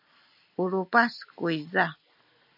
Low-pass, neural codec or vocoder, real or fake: 5.4 kHz; none; real